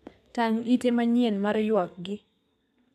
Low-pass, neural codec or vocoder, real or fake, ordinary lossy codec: 14.4 kHz; codec, 32 kHz, 1.9 kbps, SNAC; fake; none